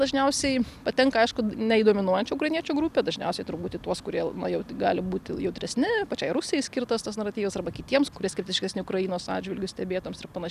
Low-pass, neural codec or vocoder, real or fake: 14.4 kHz; none; real